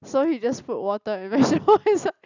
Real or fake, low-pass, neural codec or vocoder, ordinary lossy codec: real; 7.2 kHz; none; none